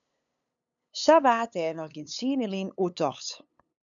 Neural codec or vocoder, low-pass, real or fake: codec, 16 kHz, 8 kbps, FunCodec, trained on LibriTTS, 25 frames a second; 7.2 kHz; fake